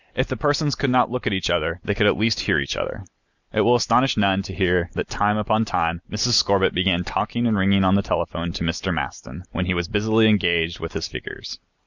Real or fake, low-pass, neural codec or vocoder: real; 7.2 kHz; none